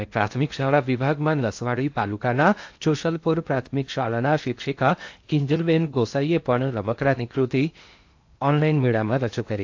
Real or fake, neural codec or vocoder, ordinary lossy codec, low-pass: fake; codec, 16 kHz in and 24 kHz out, 0.6 kbps, FocalCodec, streaming, 2048 codes; AAC, 48 kbps; 7.2 kHz